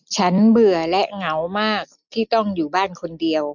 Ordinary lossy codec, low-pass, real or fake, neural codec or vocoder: none; 7.2 kHz; real; none